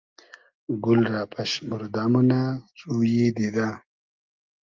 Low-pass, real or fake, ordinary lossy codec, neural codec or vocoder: 7.2 kHz; real; Opus, 32 kbps; none